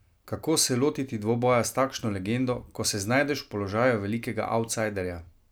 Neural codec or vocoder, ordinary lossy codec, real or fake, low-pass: none; none; real; none